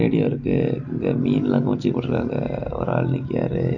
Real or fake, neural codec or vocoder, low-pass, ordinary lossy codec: real; none; 7.2 kHz; none